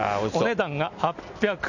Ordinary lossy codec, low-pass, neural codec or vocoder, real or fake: none; 7.2 kHz; none; real